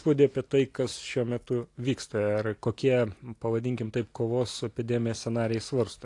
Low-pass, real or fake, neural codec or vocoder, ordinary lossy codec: 10.8 kHz; real; none; AAC, 48 kbps